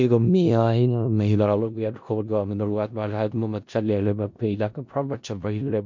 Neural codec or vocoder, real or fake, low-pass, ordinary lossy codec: codec, 16 kHz in and 24 kHz out, 0.4 kbps, LongCat-Audio-Codec, four codebook decoder; fake; 7.2 kHz; MP3, 48 kbps